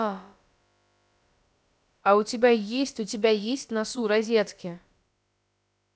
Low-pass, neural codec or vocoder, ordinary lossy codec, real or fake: none; codec, 16 kHz, about 1 kbps, DyCAST, with the encoder's durations; none; fake